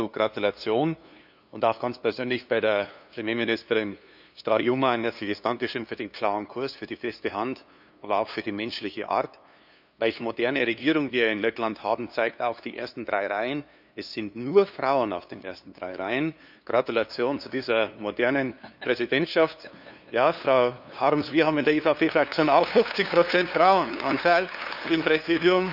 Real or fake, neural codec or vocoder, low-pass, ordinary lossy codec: fake; codec, 16 kHz, 2 kbps, FunCodec, trained on LibriTTS, 25 frames a second; 5.4 kHz; none